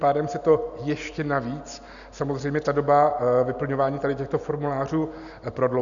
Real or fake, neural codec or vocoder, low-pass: real; none; 7.2 kHz